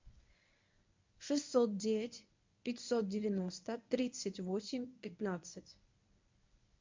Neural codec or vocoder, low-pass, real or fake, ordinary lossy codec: codec, 24 kHz, 0.9 kbps, WavTokenizer, medium speech release version 1; 7.2 kHz; fake; MP3, 48 kbps